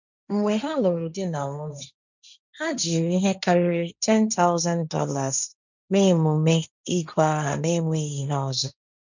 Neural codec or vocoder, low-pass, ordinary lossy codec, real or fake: codec, 16 kHz, 1.1 kbps, Voila-Tokenizer; 7.2 kHz; none; fake